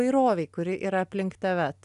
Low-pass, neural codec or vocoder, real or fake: 10.8 kHz; none; real